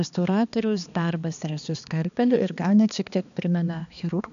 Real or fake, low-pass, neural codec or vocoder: fake; 7.2 kHz; codec, 16 kHz, 2 kbps, X-Codec, HuBERT features, trained on balanced general audio